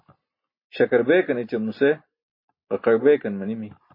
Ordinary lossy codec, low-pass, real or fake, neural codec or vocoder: MP3, 24 kbps; 5.4 kHz; real; none